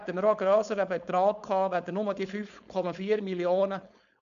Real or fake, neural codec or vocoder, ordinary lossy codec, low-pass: fake; codec, 16 kHz, 4.8 kbps, FACodec; none; 7.2 kHz